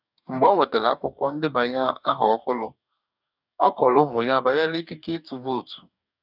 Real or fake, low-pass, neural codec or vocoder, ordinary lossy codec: fake; 5.4 kHz; codec, 44.1 kHz, 2.6 kbps, DAC; none